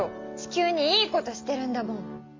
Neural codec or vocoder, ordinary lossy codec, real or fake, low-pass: none; MP3, 64 kbps; real; 7.2 kHz